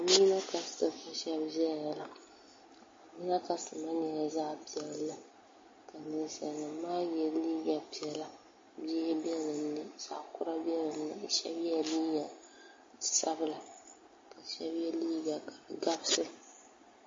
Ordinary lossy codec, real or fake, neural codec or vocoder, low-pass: MP3, 32 kbps; real; none; 7.2 kHz